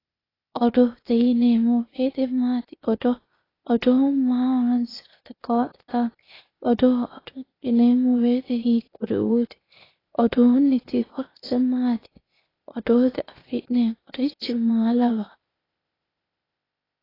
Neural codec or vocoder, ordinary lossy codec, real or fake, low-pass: codec, 16 kHz, 0.8 kbps, ZipCodec; AAC, 24 kbps; fake; 5.4 kHz